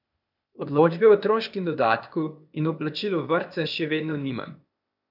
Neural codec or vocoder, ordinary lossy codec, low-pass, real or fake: codec, 16 kHz, 0.8 kbps, ZipCodec; none; 5.4 kHz; fake